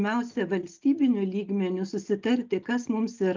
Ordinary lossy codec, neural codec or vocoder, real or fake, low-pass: Opus, 24 kbps; none; real; 7.2 kHz